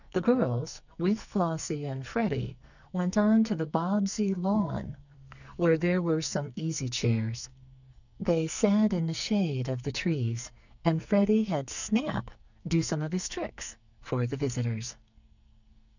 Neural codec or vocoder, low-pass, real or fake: codec, 44.1 kHz, 2.6 kbps, SNAC; 7.2 kHz; fake